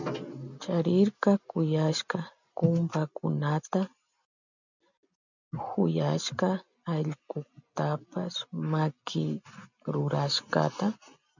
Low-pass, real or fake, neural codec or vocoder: 7.2 kHz; real; none